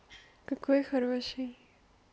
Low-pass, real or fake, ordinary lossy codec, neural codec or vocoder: none; real; none; none